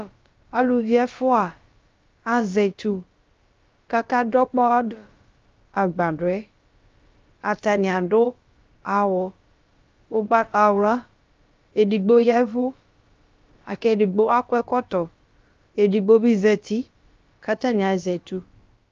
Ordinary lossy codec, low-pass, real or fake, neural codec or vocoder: Opus, 24 kbps; 7.2 kHz; fake; codec, 16 kHz, about 1 kbps, DyCAST, with the encoder's durations